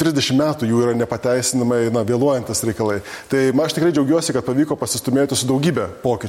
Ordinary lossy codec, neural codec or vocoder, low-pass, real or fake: MP3, 64 kbps; none; 19.8 kHz; real